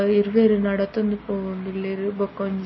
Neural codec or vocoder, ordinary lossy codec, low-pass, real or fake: none; MP3, 24 kbps; 7.2 kHz; real